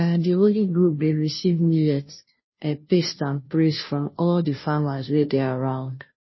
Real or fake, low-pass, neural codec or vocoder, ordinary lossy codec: fake; 7.2 kHz; codec, 16 kHz, 0.5 kbps, FunCodec, trained on Chinese and English, 25 frames a second; MP3, 24 kbps